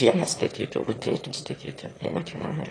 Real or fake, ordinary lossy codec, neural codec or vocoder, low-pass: fake; AAC, 32 kbps; autoencoder, 22.05 kHz, a latent of 192 numbers a frame, VITS, trained on one speaker; 9.9 kHz